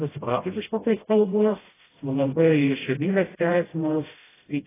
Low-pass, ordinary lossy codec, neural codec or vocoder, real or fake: 3.6 kHz; AAC, 16 kbps; codec, 16 kHz, 0.5 kbps, FreqCodec, smaller model; fake